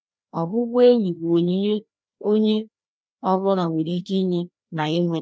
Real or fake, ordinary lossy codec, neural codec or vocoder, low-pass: fake; none; codec, 16 kHz, 1 kbps, FreqCodec, larger model; none